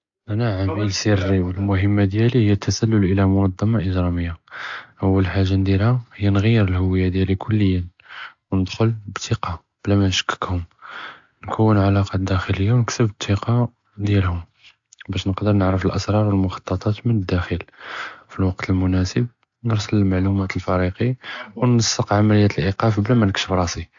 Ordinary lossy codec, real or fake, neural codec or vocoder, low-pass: AAC, 64 kbps; real; none; 7.2 kHz